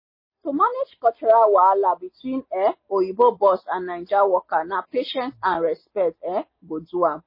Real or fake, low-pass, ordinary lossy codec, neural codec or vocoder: real; 5.4 kHz; MP3, 24 kbps; none